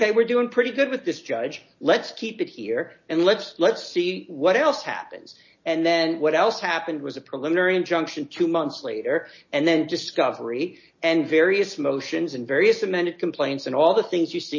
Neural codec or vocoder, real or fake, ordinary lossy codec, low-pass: none; real; MP3, 32 kbps; 7.2 kHz